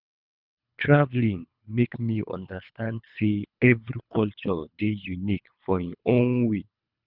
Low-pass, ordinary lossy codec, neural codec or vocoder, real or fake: 5.4 kHz; none; codec, 24 kHz, 3 kbps, HILCodec; fake